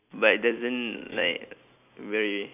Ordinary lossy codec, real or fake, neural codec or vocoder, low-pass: none; real; none; 3.6 kHz